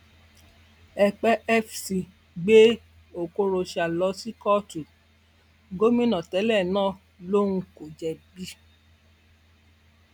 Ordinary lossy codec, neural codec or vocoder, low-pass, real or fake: none; none; 19.8 kHz; real